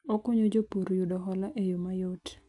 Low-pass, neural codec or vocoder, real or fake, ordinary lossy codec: 10.8 kHz; none; real; AAC, 64 kbps